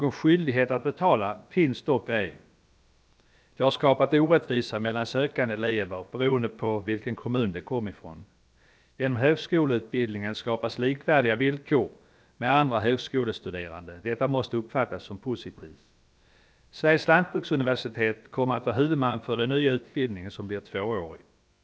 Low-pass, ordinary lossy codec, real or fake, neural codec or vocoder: none; none; fake; codec, 16 kHz, about 1 kbps, DyCAST, with the encoder's durations